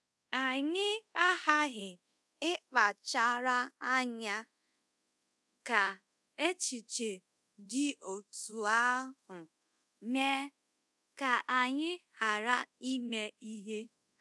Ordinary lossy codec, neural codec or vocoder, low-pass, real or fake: none; codec, 24 kHz, 0.5 kbps, DualCodec; none; fake